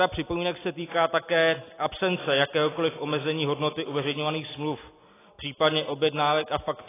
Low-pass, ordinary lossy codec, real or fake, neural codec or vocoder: 3.6 kHz; AAC, 16 kbps; real; none